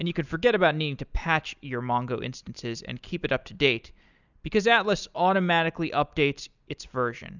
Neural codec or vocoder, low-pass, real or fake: none; 7.2 kHz; real